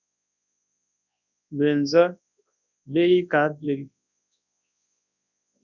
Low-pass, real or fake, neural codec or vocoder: 7.2 kHz; fake; codec, 24 kHz, 0.9 kbps, WavTokenizer, large speech release